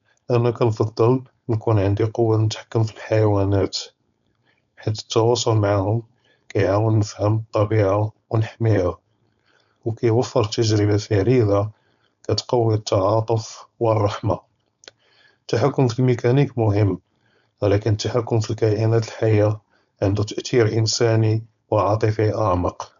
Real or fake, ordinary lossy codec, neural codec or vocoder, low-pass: fake; none; codec, 16 kHz, 4.8 kbps, FACodec; 7.2 kHz